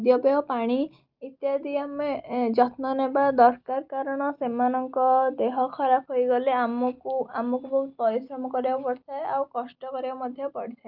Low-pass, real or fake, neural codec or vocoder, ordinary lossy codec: 5.4 kHz; real; none; Opus, 24 kbps